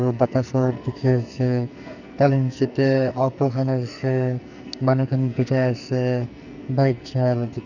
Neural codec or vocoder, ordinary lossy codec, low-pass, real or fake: codec, 44.1 kHz, 2.6 kbps, SNAC; none; 7.2 kHz; fake